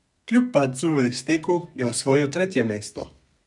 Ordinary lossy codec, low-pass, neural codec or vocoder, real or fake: none; 10.8 kHz; codec, 32 kHz, 1.9 kbps, SNAC; fake